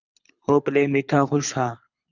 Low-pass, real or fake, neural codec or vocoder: 7.2 kHz; fake; codec, 24 kHz, 6 kbps, HILCodec